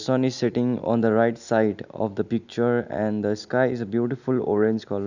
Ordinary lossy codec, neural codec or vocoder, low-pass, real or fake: none; none; 7.2 kHz; real